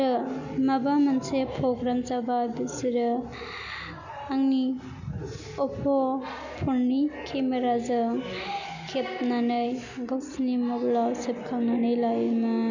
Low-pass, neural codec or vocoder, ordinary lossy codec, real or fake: 7.2 kHz; none; none; real